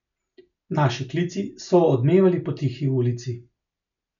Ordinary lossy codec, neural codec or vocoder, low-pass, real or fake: none; none; 7.2 kHz; real